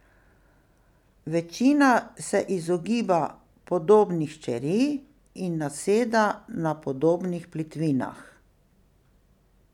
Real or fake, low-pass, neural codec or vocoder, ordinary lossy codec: real; 19.8 kHz; none; none